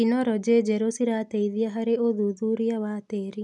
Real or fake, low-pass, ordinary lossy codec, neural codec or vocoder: real; none; none; none